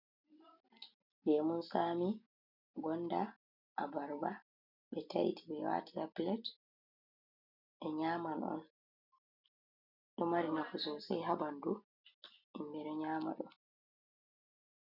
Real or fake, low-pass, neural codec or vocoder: real; 5.4 kHz; none